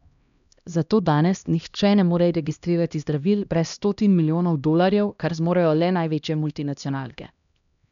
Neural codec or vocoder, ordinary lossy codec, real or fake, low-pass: codec, 16 kHz, 1 kbps, X-Codec, HuBERT features, trained on LibriSpeech; none; fake; 7.2 kHz